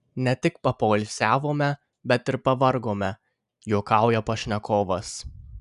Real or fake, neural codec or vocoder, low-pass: real; none; 10.8 kHz